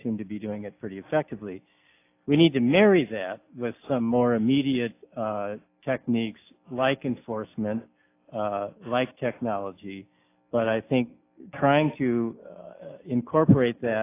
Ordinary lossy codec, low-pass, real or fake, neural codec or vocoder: AAC, 24 kbps; 3.6 kHz; real; none